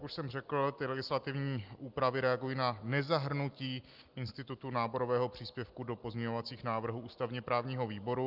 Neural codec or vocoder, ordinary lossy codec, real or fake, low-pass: none; Opus, 24 kbps; real; 5.4 kHz